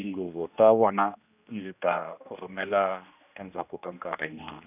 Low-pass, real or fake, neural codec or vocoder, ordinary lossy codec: 3.6 kHz; fake; codec, 16 kHz, 1 kbps, X-Codec, HuBERT features, trained on general audio; none